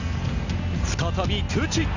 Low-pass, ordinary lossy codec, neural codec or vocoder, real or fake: 7.2 kHz; none; none; real